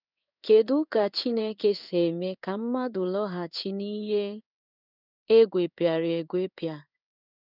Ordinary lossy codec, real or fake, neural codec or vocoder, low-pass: none; fake; codec, 16 kHz in and 24 kHz out, 1 kbps, XY-Tokenizer; 5.4 kHz